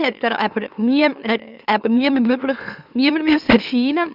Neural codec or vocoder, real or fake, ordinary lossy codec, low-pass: autoencoder, 44.1 kHz, a latent of 192 numbers a frame, MeloTTS; fake; none; 5.4 kHz